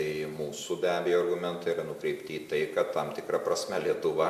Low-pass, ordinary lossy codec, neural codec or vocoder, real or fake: 14.4 kHz; AAC, 96 kbps; none; real